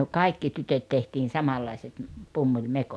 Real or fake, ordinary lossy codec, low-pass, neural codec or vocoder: real; none; none; none